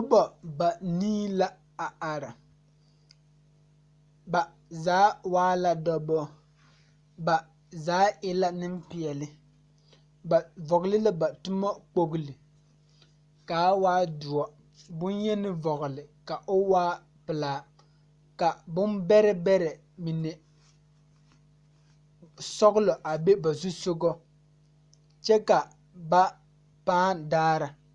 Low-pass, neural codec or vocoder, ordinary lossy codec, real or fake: 10.8 kHz; none; Opus, 64 kbps; real